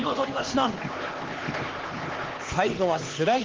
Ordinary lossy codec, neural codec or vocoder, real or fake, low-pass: Opus, 16 kbps; codec, 16 kHz, 2 kbps, X-Codec, HuBERT features, trained on LibriSpeech; fake; 7.2 kHz